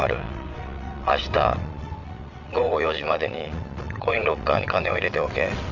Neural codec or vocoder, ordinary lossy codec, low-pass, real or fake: vocoder, 22.05 kHz, 80 mel bands, WaveNeXt; none; 7.2 kHz; fake